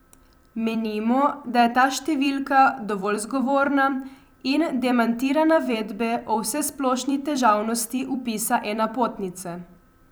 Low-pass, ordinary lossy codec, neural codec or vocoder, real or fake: none; none; vocoder, 44.1 kHz, 128 mel bands every 256 samples, BigVGAN v2; fake